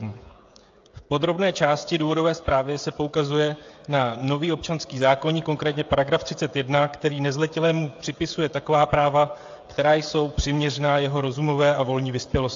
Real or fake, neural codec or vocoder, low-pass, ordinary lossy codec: fake; codec, 16 kHz, 16 kbps, FreqCodec, smaller model; 7.2 kHz; AAC, 48 kbps